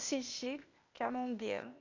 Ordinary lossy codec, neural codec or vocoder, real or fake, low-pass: none; codec, 16 kHz, 0.8 kbps, ZipCodec; fake; 7.2 kHz